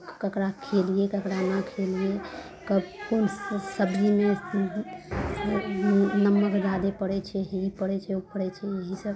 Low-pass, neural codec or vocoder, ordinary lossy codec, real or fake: none; none; none; real